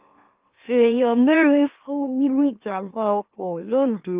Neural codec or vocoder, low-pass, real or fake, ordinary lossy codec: autoencoder, 44.1 kHz, a latent of 192 numbers a frame, MeloTTS; 3.6 kHz; fake; Opus, 32 kbps